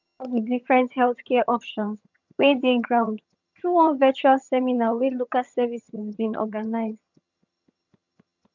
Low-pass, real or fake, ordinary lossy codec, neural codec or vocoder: 7.2 kHz; fake; none; vocoder, 22.05 kHz, 80 mel bands, HiFi-GAN